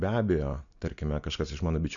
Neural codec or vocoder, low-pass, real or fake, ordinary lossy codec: none; 7.2 kHz; real; MP3, 96 kbps